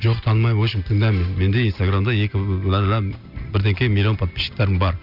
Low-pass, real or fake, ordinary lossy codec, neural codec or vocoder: 5.4 kHz; real; none; none